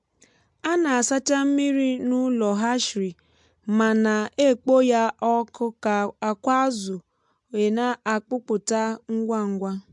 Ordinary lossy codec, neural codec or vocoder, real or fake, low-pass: MP3, 64 kbps; none; real; 10.8 kHz